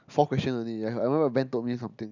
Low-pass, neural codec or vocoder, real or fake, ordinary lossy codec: 7.2 kHz; none; real; none